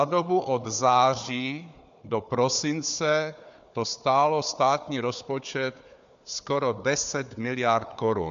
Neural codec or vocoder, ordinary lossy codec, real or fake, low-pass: codec, 16 kHz, 4 kbps, FunCodec, trained on Chinese and English, 50 frames a second; MP3, 64 kbps; fake; 7.2 kHz